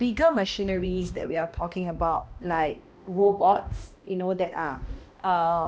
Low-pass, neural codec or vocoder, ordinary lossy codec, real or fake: none; codec, 16 kHz, 1 kbps, X-Codec, HuBERT features, trained on balanced general audio; none; fake